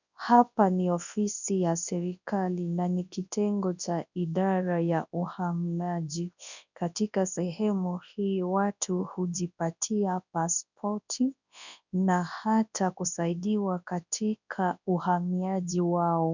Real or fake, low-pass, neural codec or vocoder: fake; 7.2 kHz; codec, 24 kHz, 0.9 kbps, WavTokenizer, large speech release